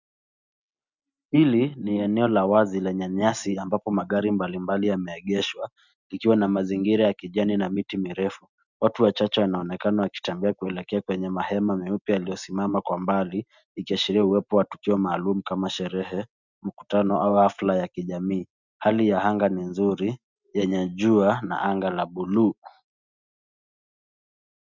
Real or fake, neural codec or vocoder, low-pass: real; none; 7.2 kHz